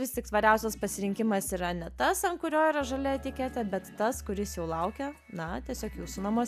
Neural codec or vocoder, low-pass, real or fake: none; 14.4 kHz; real